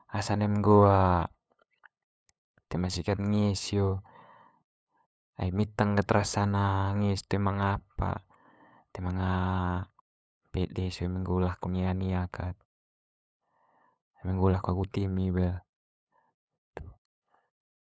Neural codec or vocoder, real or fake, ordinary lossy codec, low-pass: codec, 16 kHz, 8 kbps, FunCodec, trained on LibriTTS, 25 frames a second; fake; none; none